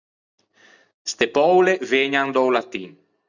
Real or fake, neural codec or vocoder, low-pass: real; none; 7.2 kHz